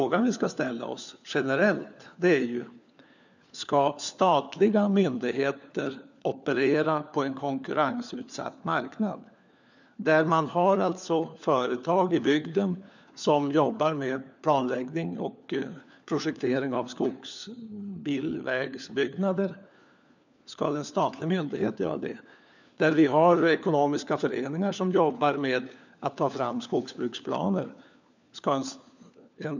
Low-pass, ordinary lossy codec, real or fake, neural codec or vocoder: 7.2 kHz; none; fake; codec, 16 kHz, 4 kbps, FunCodec, trained on LibriTTS, 50 frames a second